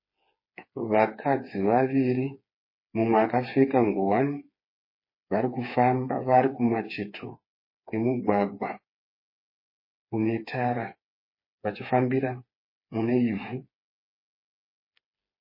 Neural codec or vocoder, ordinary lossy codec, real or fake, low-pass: codec, 16 kHz, 4 kbps, FreqCodec, smaller model; MP3, 24 kbps; fake; 5.4 kHz